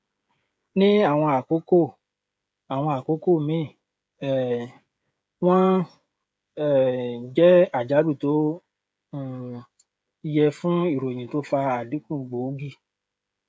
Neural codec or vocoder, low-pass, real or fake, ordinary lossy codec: codec, 16 kHz, 16 kbps, FreqCodec, smaller model; none; fake; none